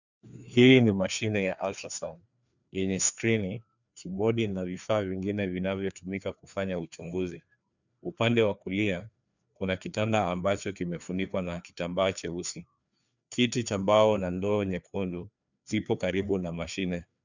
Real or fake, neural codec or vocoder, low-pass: fake; codec, 16 kHz, 2 kbps, FreqCodec, larger model; 7.2 kHz